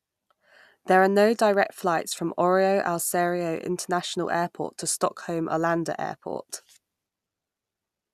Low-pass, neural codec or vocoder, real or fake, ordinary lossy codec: 14.4 kHz; none; real; none